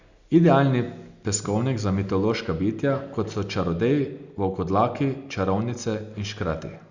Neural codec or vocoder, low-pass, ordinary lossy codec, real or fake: none; 7.2 kHz; Opus, 64 kbps; real